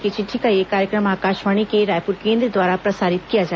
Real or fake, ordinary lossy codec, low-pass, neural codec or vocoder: real; none; none; none